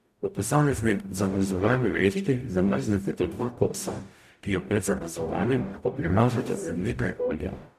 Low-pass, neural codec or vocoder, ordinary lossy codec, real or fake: 14.4 kHz; codec, 44.1 kHz, 0.9 kbps, DAC; none; fake